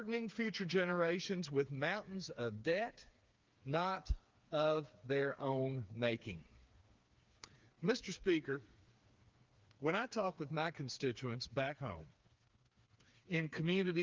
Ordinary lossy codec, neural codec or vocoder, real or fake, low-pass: Opus, 32 kbps; codec, 16 kHz, 4 kbps, FreqCodec, smaller model; fake; 7.2 kHz